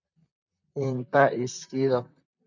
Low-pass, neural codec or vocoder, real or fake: 7.2 kHz; vocoder, 44.1 kHz, 128 mel bands, Pupu-Vocoder; fake